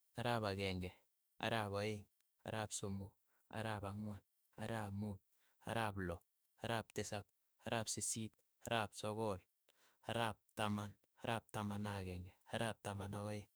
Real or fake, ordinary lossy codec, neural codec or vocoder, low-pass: fake; none; autoencoder, 48 kHz, 32 numbers a frame, DAC-VAE, trained on Japanese speech; none